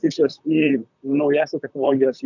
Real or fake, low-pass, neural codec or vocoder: fake; 7.2 kHz; vocoder, 44.1 kHz, 128 mel bands, Pupu-Vocoder